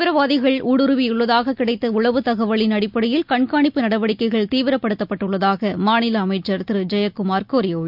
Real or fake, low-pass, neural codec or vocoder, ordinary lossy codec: real; 5.4 kHz; none; none